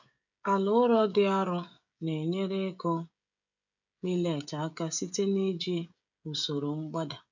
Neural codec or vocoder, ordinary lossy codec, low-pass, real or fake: codec, 16 kHz, 16 kbps, FreqCodec, smaller model; none; 7.2 kHz; fake